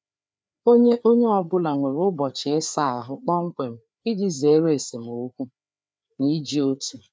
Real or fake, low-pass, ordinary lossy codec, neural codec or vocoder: fake; none; none; codec, 16 kHz, 4 kbps, FreqCodec, larger model